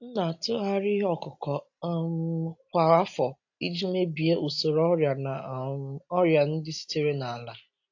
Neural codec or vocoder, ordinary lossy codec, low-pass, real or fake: none; AAC, 48 kbps; 7.2 kHz; real